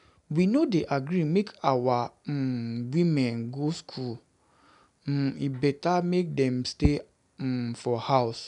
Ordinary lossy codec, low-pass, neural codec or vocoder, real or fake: none; 10.8 kHz; none; real